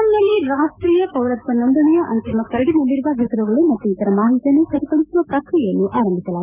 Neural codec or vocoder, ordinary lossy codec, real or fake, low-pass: codec, 16 kHz, 6 kbps, DAC; none; fake; 3.6 kHz